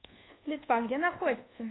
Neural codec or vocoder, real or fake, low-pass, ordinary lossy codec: codec, 24 kHz, 1.2 kbps, DualCodec; fake; 7.2 kHz; AAC, 16 kbps